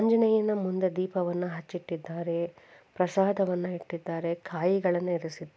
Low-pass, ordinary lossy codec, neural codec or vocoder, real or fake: none; none; none; real